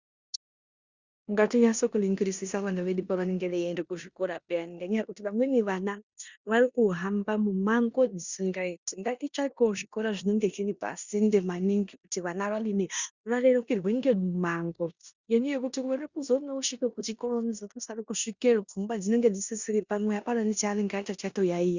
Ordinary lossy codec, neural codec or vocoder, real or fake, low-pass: Opus, 64 kbps; codec, 16 kHz in and 24 kHz out, 0.9 kbps, LongCat-Audio-Codec, four codebook decoder; fake; 7.2 kHz